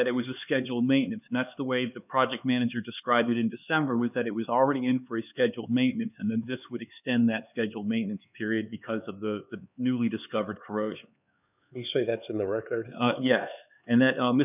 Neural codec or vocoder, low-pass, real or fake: codec, 16 kHz, 4 kbps, X-Codec, HuBERT features, trained on LibriSpeech; 3.6 kHz; fake